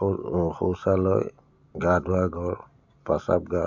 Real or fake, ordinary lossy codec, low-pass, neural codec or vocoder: real; none; 7.2 kHz; none